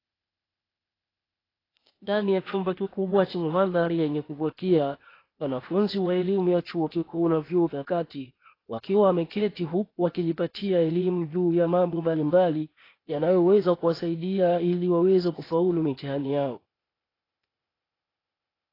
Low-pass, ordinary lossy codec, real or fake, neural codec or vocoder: 5.4 kHz; AAC, 24 kbps; fake; codec, 16 kHz, 0.8 kbps, ZipCodec